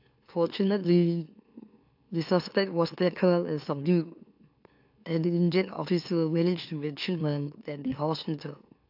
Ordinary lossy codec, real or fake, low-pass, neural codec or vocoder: AAC, 48 kbps; fake; 5.4 kHz; autoencoder, 44.1 kHz, a latent of 192 numbers a frame, MeloTTS